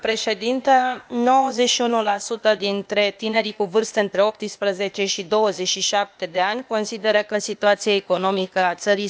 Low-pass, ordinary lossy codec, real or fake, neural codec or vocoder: none; none; fake; codec, 16 kHz, 0.8 kbps, ZipCodec